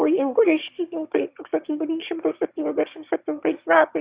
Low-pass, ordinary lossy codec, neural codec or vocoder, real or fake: 3.6 kHz; Opus, 64 kbps; autoencoder, 22.05 kHz, a latent of 192 numbers a frame, VITS, trained on one speaker; fake